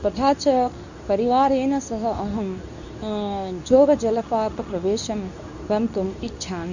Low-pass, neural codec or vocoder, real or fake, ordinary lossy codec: 7.2 kHz; codec, 24 kHz, 0.9 kbps, WavTokenizer, medium speech release version 2; fake; none